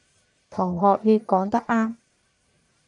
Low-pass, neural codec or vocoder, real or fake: 10.8 kHz; codec, 44.1 kHz, 1.7 kbps, Pupu-Codec; fake